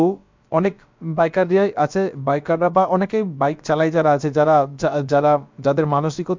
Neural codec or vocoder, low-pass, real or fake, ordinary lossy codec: codec, 16 kHz, about 1 kbps, DyCAST, with the encoder's durations; 7.2 kHz; fake; MP3, 64 kbps